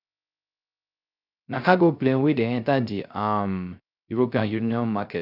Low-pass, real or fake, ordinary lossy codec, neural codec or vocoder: 5.4 kHz; fake; none; codec, 16 kHz, 0.3 kbps, FocalCodec